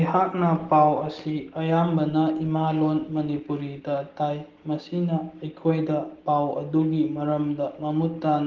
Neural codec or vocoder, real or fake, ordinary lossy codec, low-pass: none; real; Opus, 16 kbps; 7.2 kHz